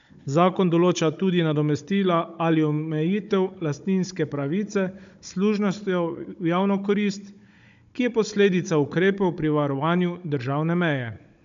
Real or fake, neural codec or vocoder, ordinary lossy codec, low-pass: fake; codec, 16 kHz, 16 kbps, FunCodec, trained on Chinese and English, 50 frames a second; MP3, 64 kbps; 7.2 kHz